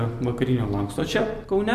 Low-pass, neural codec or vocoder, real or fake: 14.4 kHz; none; real